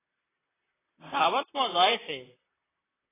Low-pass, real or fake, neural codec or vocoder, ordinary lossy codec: 3.6 kHz; fake; vocoder, 44.1 kHz, 128 mel bands, Pupu-Vocoder; AAC, 16 kbps